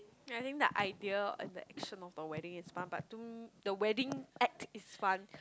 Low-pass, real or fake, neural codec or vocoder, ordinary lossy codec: none; real; none; none